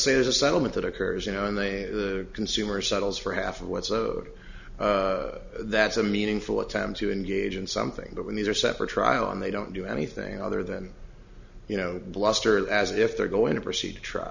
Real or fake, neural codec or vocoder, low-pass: real; none; 7.2 kHz